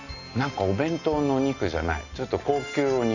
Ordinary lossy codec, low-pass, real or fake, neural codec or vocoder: none; 7.2 kHz; real; none